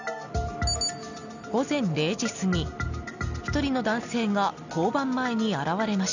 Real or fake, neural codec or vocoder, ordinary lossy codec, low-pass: real; none; none; 7.2 kHz